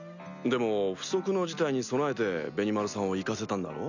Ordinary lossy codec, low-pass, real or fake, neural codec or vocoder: none; 7.2 kHz; real; none